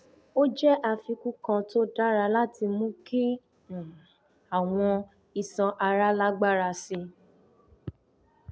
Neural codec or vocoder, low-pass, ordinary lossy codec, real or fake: none; none; none; real